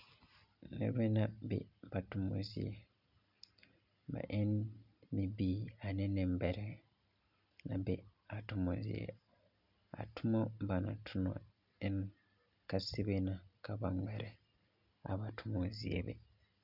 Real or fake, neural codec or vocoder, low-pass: real; none; 5.4 kHz